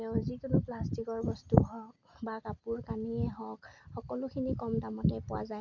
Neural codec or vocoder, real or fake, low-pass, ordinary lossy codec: none; real; 7.2 kHz; none